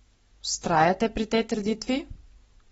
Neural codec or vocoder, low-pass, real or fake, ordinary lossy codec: none; 19.8 kHz; real; AAC, 24 kbps